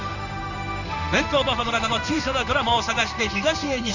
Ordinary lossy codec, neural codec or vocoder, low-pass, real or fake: none; codec, 16 kHz in and 24 kHz out, 1 kbps, XY-Tokenizer; 7.2 kHz; fake